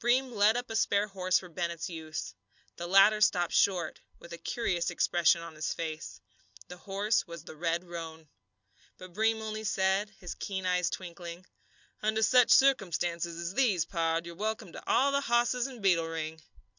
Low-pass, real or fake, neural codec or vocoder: 7.2 kHz; real; none